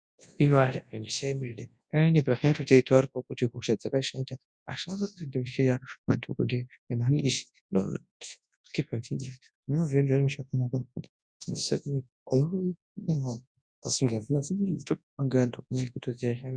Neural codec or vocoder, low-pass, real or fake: codec, 24 kHz, 0.9 kbps, WavTokenizer, large speech release; 9.9 kHz; fake